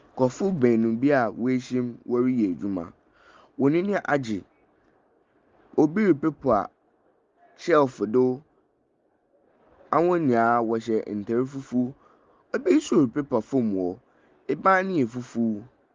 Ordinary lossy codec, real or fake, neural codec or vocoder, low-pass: Opus, 32 kbps; real; none; 7.2 kHz